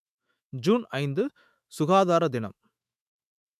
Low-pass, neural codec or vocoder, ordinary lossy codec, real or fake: 14.4 kHz; autoencoder, 48 kHz, 128 numbers a frame, DAC-VAE, trained on Japanese speech; MP3, 96 kbps; fake